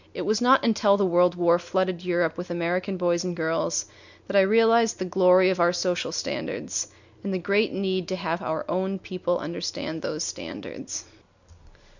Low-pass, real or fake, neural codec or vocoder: 7.2 kHz; real; none